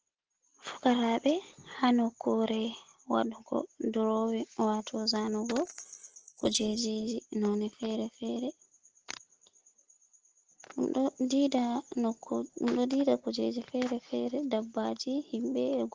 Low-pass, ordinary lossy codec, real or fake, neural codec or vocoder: 7.2 kHz; Opus, 16 kbps; real; none